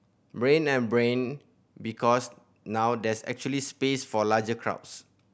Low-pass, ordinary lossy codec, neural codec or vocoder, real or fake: none; none; none; real